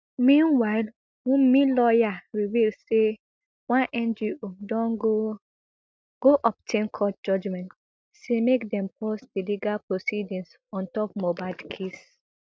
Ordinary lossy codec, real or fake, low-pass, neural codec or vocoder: none; real; none; none